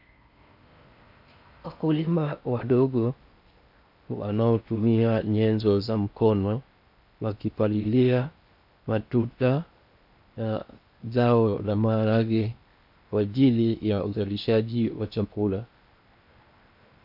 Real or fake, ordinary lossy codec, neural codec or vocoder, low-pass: fake; AAC, 48 kbps; codec, 16 kHz in and 24 kHz out, 0.6 kbps, FocalCodec, streaming, 4096 codes; 5.4 kHz